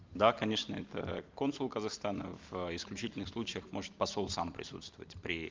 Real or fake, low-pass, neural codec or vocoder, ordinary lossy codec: real; 7.2 kHz; none; Opus, 24 kbps